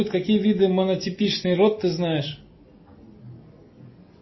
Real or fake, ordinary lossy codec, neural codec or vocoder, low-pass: real; MP3, 24 kbps; none; 7.2 kHz